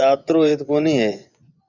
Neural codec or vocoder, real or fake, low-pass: vocoder, 24 kHz, 100 mel bands, Vocos; fake; 7.2 kHz